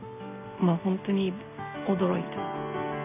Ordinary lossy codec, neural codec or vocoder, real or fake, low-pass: none; none; real; 3.6 kHz